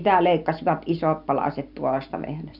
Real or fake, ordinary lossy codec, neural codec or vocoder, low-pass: real; none; none; 5.4 kHz